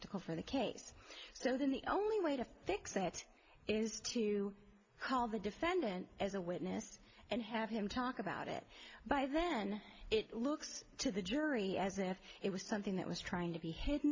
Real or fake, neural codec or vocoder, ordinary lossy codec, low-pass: real; none; MP3, 48 kbps; 7.2 kHz